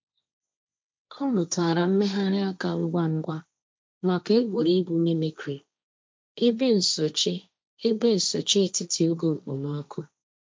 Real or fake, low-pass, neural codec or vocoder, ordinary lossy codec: fake; none; codec, 16 kHz, 1.1 kbps, Voila-Tokenizer; none